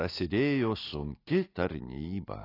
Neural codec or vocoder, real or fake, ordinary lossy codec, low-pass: none; real; AAC, 24 kbps; 5.4 kHz